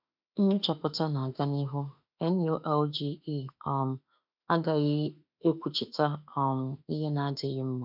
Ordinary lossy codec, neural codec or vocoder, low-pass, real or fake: none; autoencoder, 48 kHz, 32 numbers a frame, DAC-VAE, trained on Japanese speech; 5.4 kHz; fake